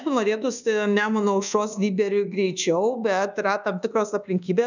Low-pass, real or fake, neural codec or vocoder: 7.2 kHz; fake; codec, 24 kHz, 1.2 kbps, DualCodec